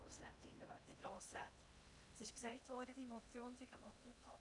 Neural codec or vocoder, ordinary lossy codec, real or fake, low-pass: codec, 16 kHz in and 24 kHz out, 0.8 kbps, FocalCodec, streaming, 65536 codes; none; fake; 10.8 kHz